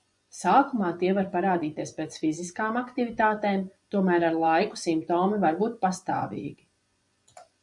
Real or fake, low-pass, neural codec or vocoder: fake; 10.8 kHz; vocoder, 24 kHz, 100 mel bands, Vocos